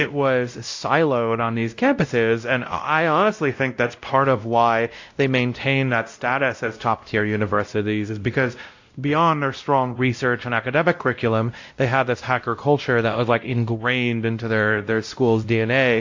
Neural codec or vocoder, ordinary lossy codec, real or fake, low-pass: codec, 16 kHz, 0.5 kbps, X-Codec, WavLM features, trained on Multilingual LibriSpeech; AAC, 48 kbps; fake; 7.2 kHz